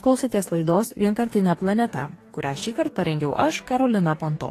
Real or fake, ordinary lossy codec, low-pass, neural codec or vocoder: fake; AAC, 48 kbps; 14.4 kHz; codec, 44.1 kHz, 2.6 kbps, DAC